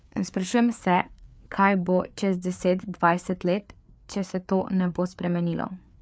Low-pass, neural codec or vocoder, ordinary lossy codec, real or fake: none; codec, 16 kHz, 4 kbps, FreqCodec, larger model; none; fake